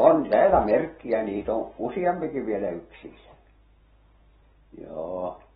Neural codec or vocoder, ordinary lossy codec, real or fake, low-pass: none; AAC, 16 kbps; real; 19.8 kHz